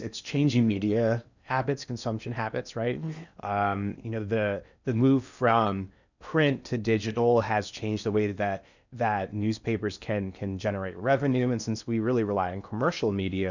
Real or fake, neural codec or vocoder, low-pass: fake; codec, 16 kHz in and 24 kHz out, 0.8 kbps, FocalCodec, streaming, 65536 codes; 7.2 kHz